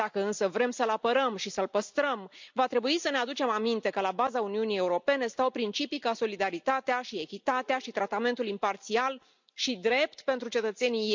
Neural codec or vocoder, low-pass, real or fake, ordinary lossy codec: none; 7.2 kHz; real; MP3, 64 kbps